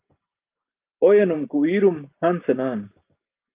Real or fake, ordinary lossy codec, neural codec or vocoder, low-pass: real; Opus, 24 kbps; none; 3.6 kHz